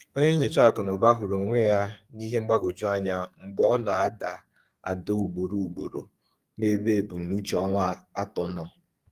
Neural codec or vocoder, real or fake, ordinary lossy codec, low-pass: codec, 32 kHz, 1.9 kbps, SNAC; fake; Opus, 32 kbps; 14.4 kHz